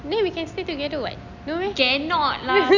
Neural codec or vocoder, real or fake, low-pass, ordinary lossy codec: none; real; 7.2 kHz; none